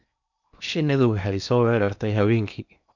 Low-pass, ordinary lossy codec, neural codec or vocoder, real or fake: 7.2 kHz; none; codec, 16 kHz in and 24 kHz out, 0.8 kbps, FocalCodec, streaming, 65536 codes; fake